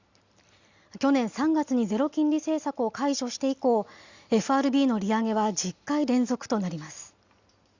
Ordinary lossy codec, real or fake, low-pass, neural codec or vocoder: Opus, 64 kbps; real; 7.2 kHz; none